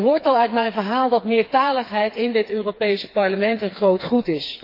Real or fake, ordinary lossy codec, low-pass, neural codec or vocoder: fake; AAC, 32 kbps; 5.4 kHz; codec, 16 kHz, 4 kbps, FreqCodec, smaller model